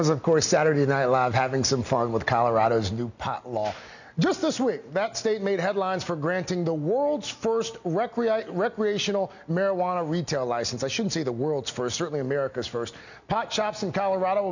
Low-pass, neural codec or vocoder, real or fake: 7.2 kHz; none; real